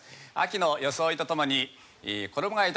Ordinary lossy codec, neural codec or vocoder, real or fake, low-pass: none; none; real; none